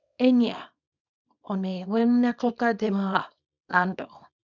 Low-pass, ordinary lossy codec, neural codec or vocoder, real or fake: 7.2 kHz; none; codec, 24 kHz, 0.9 kbps, WavTokenizer, small release; fake